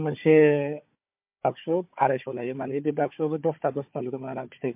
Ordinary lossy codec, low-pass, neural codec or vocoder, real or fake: MP3, 32 kbps; 3.6 kHz; codec, 16 kHz, 4 kbps, FunCodec, trained on Chinese and English, 50 frames a second; fake